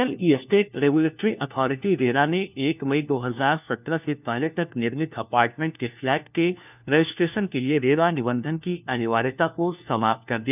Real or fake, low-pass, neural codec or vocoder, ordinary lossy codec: fake; 3.6 kHz; codec, 16 kHz, 1 kbps, FunCodec, trained on LibriTTS, 50 frames a second; none